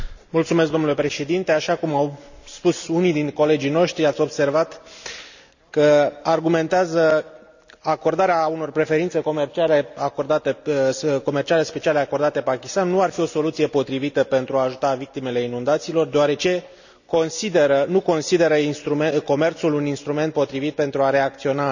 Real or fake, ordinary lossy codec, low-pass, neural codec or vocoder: real; none; 7.2 kHz; none